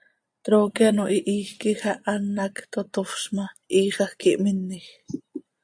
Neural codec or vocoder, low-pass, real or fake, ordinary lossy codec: none; 9.9 kHz; real; AAC, 64 kbps